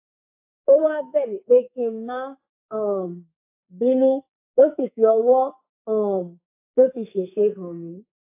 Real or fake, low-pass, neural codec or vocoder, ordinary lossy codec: fake; 3.6 kHz; codec, 44.1 kHz, 2.6 kbps, SNAC; AAC, 24 kbps